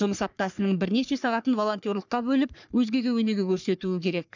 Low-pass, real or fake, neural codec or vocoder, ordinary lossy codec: 7.2 kHz; fake; codec, 44.1 kHz, 3.4 kbps, Pupu-Codec; none